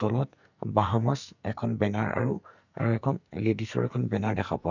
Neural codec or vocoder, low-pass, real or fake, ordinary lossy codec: codec, 44.1 kHz, 2.6 kbps, SNAC; 7.2 kHz; fake; none